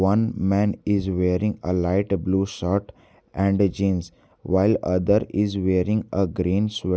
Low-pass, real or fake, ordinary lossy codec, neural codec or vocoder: none; real; none; none